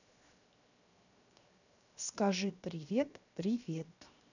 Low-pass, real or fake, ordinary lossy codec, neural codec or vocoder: 7.2 kHz; fake; none; codec, 16 kHz, 0.7 kbps, FocalCodec